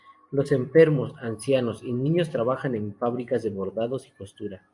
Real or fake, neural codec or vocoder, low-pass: fake; vocoder, 44.1 kHz, 128 mel bands every 512 samples, BigVGAN v2; 10.8 kHz